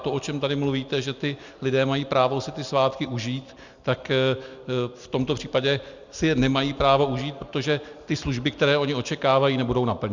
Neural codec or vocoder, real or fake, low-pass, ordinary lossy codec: none; real; 7.2 kHz; Opus, 64 kbps